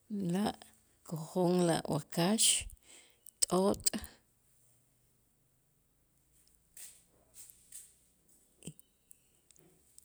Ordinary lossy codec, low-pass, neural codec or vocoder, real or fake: none; none; none; real